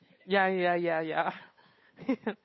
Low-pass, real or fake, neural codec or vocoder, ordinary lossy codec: 7.2 kHz; fake; codec, 16 kHz, 8 kbps, FunCodec, trained on Chinese and English, 25 frames a second; MP3, 24 kbps